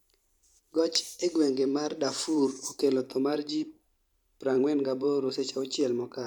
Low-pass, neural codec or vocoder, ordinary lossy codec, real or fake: 19.8 kHz; vocoder, 44.1 kHz, 128 mel bands every 512 samples, BigVGAN v2; none; fake